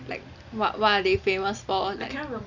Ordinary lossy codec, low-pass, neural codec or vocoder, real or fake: Opus, 64 kbps; 7.2 kHz; none; real